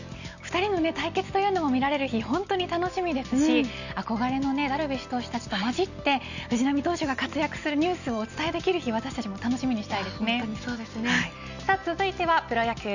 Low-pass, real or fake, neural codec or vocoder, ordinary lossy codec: 7.2 kHz; real; none; none